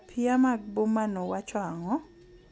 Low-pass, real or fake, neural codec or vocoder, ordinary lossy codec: none; real; none; none